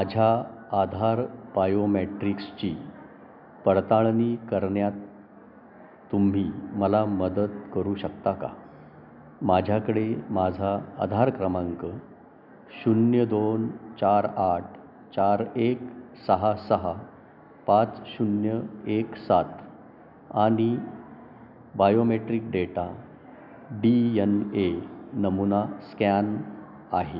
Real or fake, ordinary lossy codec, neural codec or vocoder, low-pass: real; none; none; 5.4 kHz